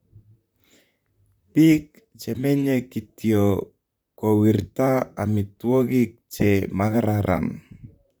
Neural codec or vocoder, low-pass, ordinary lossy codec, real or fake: vocoder, 44.1 kHz, 128 mel bands, Pupu-Vocoder; none; none; fake